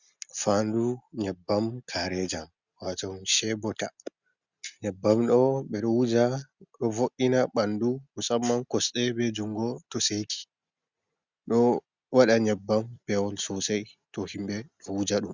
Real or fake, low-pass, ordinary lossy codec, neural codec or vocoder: real; 7.2 kHz; Opus, 64 kbps; none